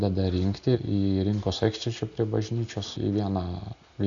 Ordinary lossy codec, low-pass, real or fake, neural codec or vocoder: AAC, 64 kbps; 7.2 kHz; real; none